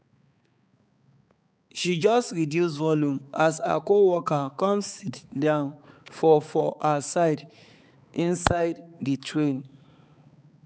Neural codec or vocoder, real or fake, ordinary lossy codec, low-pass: codec, 16 kHz, 4 kbps, X-Codec, HuBERT features, trained on balanced general audio; fake; none; none